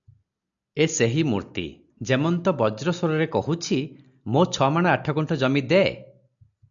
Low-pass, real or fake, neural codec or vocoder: 7.2 kHz; real; none